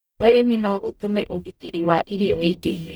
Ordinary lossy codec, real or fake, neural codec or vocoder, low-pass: none; fake; codec, 44.1 kHz, 0.9 kbps, DAC; none